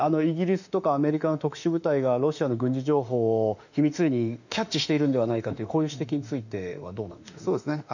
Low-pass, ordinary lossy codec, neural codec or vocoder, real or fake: 7.2 kHz; none; autoencoder, 48 kHz, 32 numbers a frame, DAC-VAE, trained on Japanese speech; fake